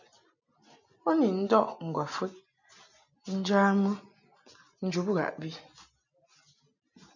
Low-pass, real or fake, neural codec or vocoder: 7.2 kHz; real; none